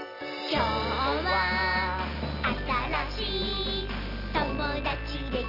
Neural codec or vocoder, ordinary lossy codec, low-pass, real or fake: none; none; 5.4 kHz; real